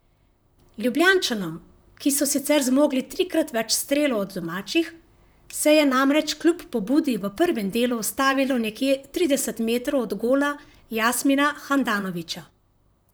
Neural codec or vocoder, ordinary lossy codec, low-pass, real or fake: vocoder, 44.1 kHz, 128 mel bands, Pupu-Vocoder; none; none; fake